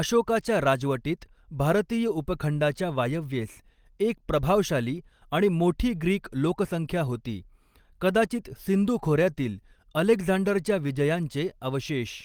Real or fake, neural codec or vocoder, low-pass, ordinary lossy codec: real; none; 19.8 kHz; Opus, 24 kbps